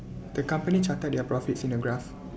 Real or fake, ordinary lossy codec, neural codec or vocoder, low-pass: real; none; none; none